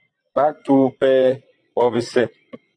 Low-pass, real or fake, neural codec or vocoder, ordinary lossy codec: 9.9 kHz; fake; vocoder, 22.05 kHz, 80 mel bands, Vocos; AAC, 48 kbps